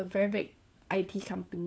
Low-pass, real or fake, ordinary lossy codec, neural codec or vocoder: none; fake; none; codec, 16 kHz, 4 kbps, FunCodec, trained on LibriTTS, 50 frames a second